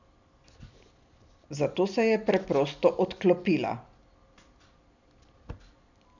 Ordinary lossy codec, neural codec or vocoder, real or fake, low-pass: none; none; real; 7.2 kHz